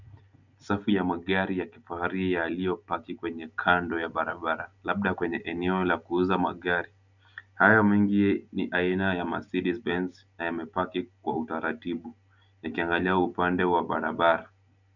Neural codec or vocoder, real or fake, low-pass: none; real; 7.2 kHz